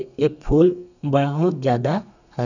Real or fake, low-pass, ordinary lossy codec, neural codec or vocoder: fake; 7.2 kHz; none; codec, 32 kHz, 1.9 kbps, SNAC